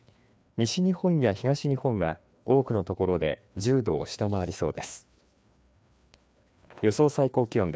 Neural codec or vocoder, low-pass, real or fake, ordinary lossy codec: codec, 16 kHz, 2 kbps, FreqCodec, larger model; none; fake; none